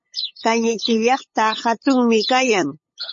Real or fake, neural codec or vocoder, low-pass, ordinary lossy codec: fake; codec, 16 kHz, 8 kbps, FunCodec, trained on LibriTTS, 25 frames a second; 7.2 kHz; MP3, 32 kbps